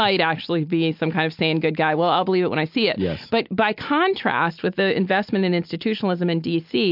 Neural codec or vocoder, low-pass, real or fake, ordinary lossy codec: none; 5.4 kHz; real; MP3, 48 kbps